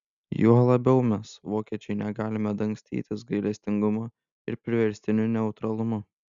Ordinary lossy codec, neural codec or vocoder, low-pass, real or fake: Opus, 64 kbps; none; 7.2 kHz; real